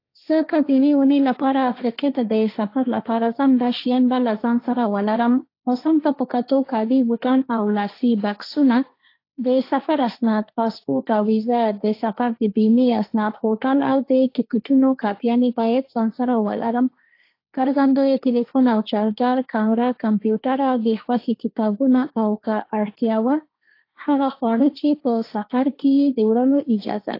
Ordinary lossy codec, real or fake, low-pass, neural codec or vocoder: AAC, 32 kbps; fake; 5.4 kHz; codec, 16 kHz, 1.1 kbps, Voila-Tokenizer